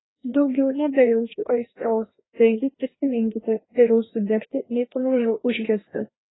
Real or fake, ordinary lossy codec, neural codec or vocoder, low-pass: fake; AAC, 16 kbps; codec, 16 kHz, 1 kbps, FreqCodec, larger model; 7.2 kHz